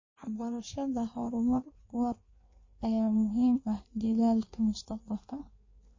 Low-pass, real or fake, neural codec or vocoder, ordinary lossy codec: 7.2 kHz; fake; codec, 16 kHz in and 24 kHz out, 1.1 kbps, FireRedTTS-2 codec; MP3, 32 kbps